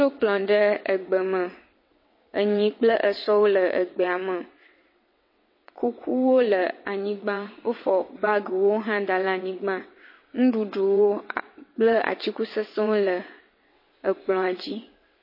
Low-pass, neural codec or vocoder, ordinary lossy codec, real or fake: 5.4 kHz; vocoder, 22.05 kHz, 80 mel bands, WaveNeXt; MP3, 24 kbps; fake